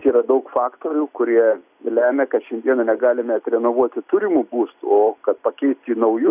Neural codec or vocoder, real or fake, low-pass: none; real; 3.6 kHz